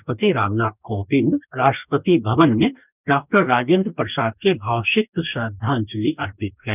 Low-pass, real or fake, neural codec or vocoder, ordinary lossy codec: 3.6 kHz; fake; codec, 44.1 kHz, 2.6 kbps, DAC; none